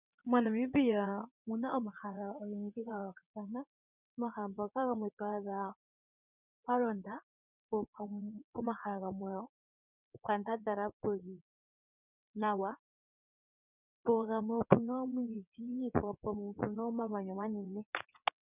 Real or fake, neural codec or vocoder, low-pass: fake; vocoder, 22.05 kHz, 80 mel bands, WaveNeXt; 3.6 kHz